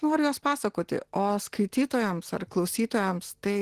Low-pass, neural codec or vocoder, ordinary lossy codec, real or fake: 14.4 kHz; none; Opus, 16 kbps; real